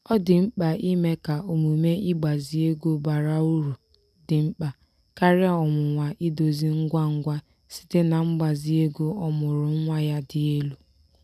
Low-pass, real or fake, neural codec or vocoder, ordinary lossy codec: 14.4 kHz; real; none; none